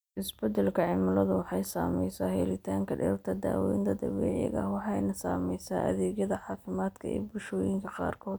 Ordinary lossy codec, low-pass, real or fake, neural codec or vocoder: none; none; real; none